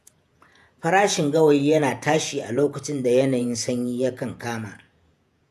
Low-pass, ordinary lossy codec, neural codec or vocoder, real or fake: 14.4 kHz; none; vocoder, 48 kHz, 128 mel bands, Vocos; fake